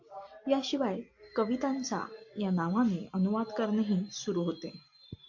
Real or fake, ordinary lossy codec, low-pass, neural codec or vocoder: real; MP3, 48 kbps; 7.2 kHz; none